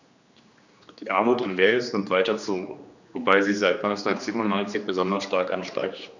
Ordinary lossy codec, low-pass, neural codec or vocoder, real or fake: none; 7.2 kHz; codec, 16 kHz, 2 kbps, X-Codec, HuBERT features, trained on general audio; fake